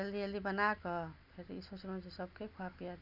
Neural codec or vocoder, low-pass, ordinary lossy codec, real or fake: none; 5.4 kHz; none; real